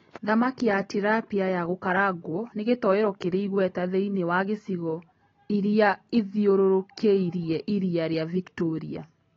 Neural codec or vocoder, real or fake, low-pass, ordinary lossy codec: none; real; 7.2 kHz; AAC, 24 kbps